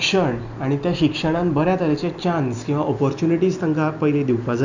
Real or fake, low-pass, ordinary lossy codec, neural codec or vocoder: real; 7.2 kHz; none; none